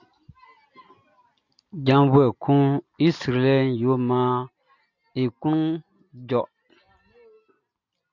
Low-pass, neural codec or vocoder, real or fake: 7.2 kHz; none; real